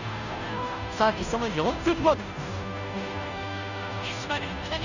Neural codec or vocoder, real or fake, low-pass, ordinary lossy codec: codec, 16 kHz, 0.5 kbps, FunCodec, trained on Chinese and English, 25 frames a second; fake; 7.2 kHz; none